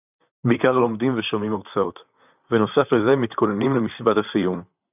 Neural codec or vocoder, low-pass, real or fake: vocoder, 44.1 kHz, 128 mel bands, Pupu-Vocoder; 3.6 kHz; fake